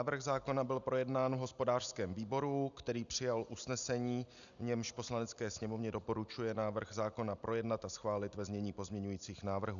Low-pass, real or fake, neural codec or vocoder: 7.2 kHz; real; none